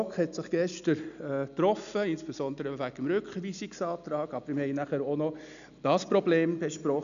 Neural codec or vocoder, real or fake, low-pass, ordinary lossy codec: none; real; 7.2 kHz; none